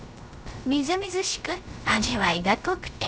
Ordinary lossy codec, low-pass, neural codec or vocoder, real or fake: none; none; codec, 16 kHz, 0.3 kbps, FocalCodec; fake